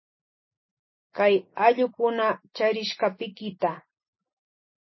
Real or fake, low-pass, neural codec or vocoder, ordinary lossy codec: real; 7.2 kHz; none; MP3, 24 kbps